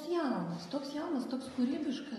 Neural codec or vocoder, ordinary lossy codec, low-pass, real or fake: none; AAC, 32 kbps; 19.8 kHz; real